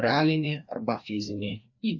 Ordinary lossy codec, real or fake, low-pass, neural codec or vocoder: AAC, 48 kbps; fake; 7.2 kHz; codec, 16 kHz, 2 kbps, FreqCodec, larger model